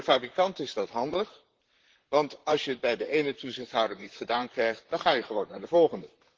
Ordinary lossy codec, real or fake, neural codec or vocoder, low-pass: Opus, 16 kbps; fake; vocoder, 44.1 kHz, 128 mel bands, Pupu-Vocoder; 7.2 kHz